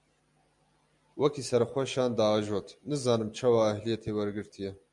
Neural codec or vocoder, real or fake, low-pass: none; real; 10.8 kHz